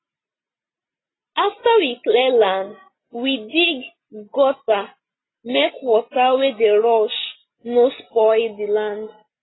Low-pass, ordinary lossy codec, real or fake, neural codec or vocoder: 7.2 kHz; AAC, 16 kbps; real; none